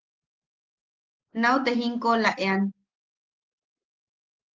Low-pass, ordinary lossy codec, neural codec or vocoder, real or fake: 7.2 kHz; Opus, 16 kbps; none; real